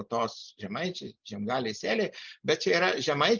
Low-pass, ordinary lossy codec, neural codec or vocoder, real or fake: 7.2 kHz; Opus, 32 kbps; none; real